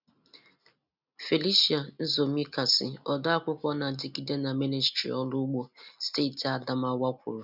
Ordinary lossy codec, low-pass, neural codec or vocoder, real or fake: none; 5.4 kHz; none; real